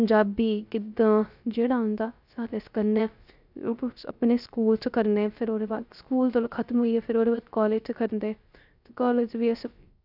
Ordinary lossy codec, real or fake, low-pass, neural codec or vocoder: none; fake; 5.4 kHz; codec, 16 kHz, 0.3 kbps, FocalCodec